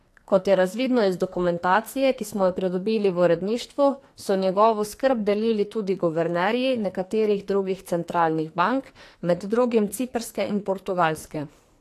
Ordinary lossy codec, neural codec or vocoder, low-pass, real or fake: AAC, 64 kbps; codec, 44.1 kHz, 2.6 kbps, SNAC; 14.4 kHz; fake